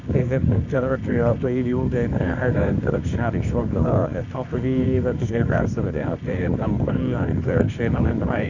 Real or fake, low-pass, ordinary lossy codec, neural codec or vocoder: fake; 7.2 kHz; none; codec, 24 kHz, 0.9 kbps, WavTokenizer, medium music audio release